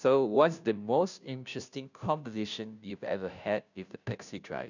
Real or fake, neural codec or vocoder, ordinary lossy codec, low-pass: fake; codec, 16 kHz, 0.5 kbps, FunCodec, trained on Chinese and English, 25 frames a second; none; 7.2 kHz